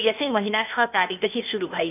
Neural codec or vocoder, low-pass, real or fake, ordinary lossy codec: codec, 16 kHz, 0.8 kbps, ZipCodec; 3.6 kHz; fake; none